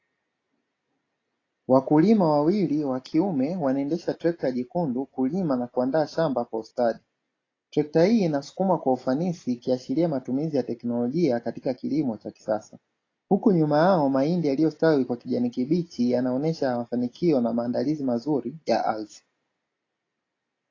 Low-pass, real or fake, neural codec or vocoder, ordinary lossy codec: 7.2 kHz; real; none; AAC, 32 kbps